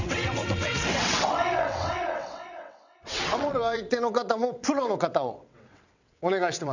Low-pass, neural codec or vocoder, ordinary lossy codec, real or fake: 7.2 kHz; vocoder, 22.05 kHz, 80 mel bands, WaveNeXt; none; fake